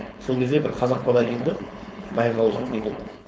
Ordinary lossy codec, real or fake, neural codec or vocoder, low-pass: none; fake; codec, 16 kHz, 4.8 kbps, FACodec; none